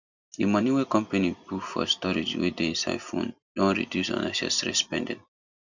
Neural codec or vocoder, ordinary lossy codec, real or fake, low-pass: none; none; real; 7.2 kHz